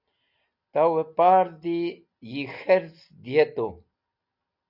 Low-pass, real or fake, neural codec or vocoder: 5.4 kHz; real; none